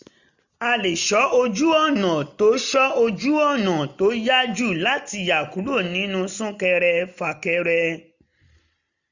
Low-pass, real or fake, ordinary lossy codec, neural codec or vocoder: 7.2 kHz; real; none; none